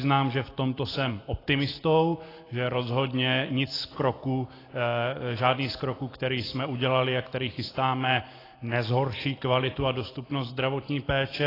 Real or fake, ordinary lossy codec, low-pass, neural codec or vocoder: real; AAC, 24 kbps; 5.4 kHz; none